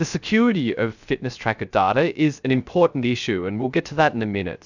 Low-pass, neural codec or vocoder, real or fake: 7.2 kHz; codec, 16 kHz, 0.3 kbps, FocalCodec; fake